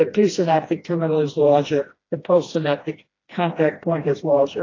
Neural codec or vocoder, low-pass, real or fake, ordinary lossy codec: codec, 16 kHz, 1 kbps, FreqCodec, smaller model; 7.2 kHz; fake; AAC, 32 kbps